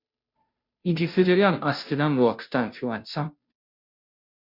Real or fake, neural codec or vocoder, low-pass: fake; codec, 16 kHz, 0.5 kbps, FunCodec, trained on Chinese and English, 25 frames a second; 5.4 kHz